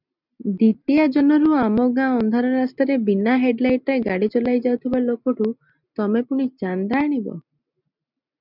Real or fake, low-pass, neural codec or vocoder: real; 5.4 kHz; none